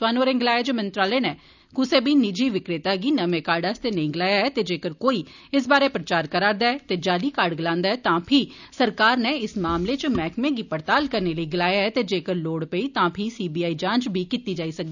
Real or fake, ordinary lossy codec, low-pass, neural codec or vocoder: real; none; 7.2 kHz; none